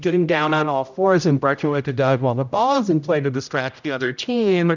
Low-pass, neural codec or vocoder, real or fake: 7.2 kHz; codec, 16 kHz, 0.5 kbps, X-Codec, HuBERT features, trained on general audio; fake